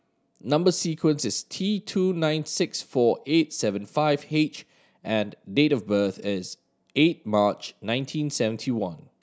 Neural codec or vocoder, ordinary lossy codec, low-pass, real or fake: none; none; none; real